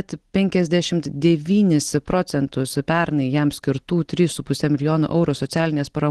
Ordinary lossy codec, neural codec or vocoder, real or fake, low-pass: Opus, 16 kbps; none; real; 10.8 kHz